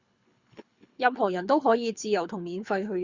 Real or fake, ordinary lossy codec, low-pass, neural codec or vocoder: fake; Opus, 64 kbps; 7.2 kHz; codec, 24 kHz, 6 kbps, HILCodec